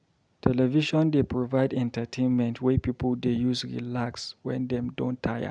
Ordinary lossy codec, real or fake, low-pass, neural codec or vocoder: none; real; 9.9 kHz; none